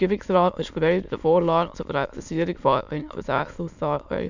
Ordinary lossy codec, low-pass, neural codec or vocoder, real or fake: none; 7.2 kHz; autoencoder, 22.05 kHz, a latent of 192 numbers a frame, VITS, trained on many speakers; fake